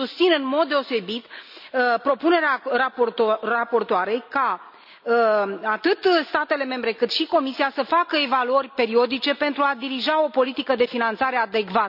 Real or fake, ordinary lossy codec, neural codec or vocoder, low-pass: real; none; none; 5.4 kHz